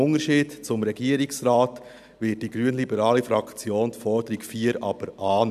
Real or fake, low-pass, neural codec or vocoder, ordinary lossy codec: real; 14.4 kHz; none; none